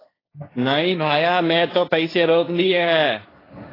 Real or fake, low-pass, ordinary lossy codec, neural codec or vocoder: fake; 5.4 kHz; AAC, 24 kbps; codec, 16 kHz, 1.1 kbps, Voila-Tokenizer